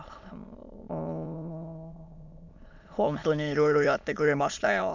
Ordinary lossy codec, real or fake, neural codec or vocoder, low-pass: none; fake; autoencoder, 22.05 kHz, a latent of 192 numbers a frame, VITS, trained on many speakers; 7.2 kHz